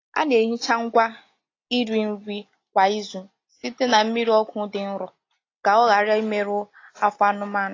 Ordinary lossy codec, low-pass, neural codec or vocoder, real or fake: AAC, 32 kbps; 7.2 kHz; none; real